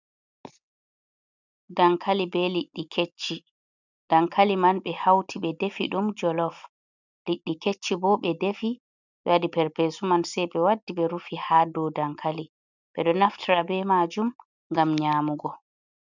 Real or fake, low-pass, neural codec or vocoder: real; 7.2 kHz; none